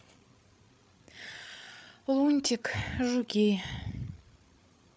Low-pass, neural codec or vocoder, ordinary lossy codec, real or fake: none; codec, 16 kHz, 8 kbps, FreqCodec, larger model; none; fake